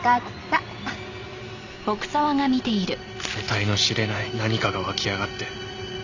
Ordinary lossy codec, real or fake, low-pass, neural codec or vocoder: none; real; 7.2 kHz; none